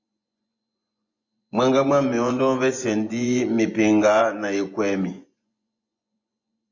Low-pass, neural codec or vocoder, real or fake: 7.2 kHz; vocoder, 24 kHz, 100 mel bands, Vocos; fake